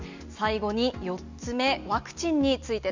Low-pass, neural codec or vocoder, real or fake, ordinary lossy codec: 7.2 kHz; none; real; Opus, 64 kbps